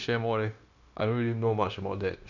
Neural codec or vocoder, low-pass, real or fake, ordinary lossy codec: codec, 16 kHz, 0.9 kbps, LongCat-Audio-Codec; 7.2 kHz; fake; none